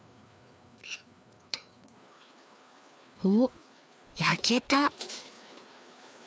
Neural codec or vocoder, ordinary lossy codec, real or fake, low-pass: codec, 16 kHz, 2 kbps, FreqCodec, larger model; none; fake; none